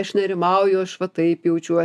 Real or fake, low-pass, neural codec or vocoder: real; 14.4 kHz; none